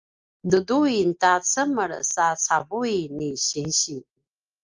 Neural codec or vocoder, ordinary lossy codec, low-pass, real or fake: none; Opus, 24 kbps; 7.2 kHz; real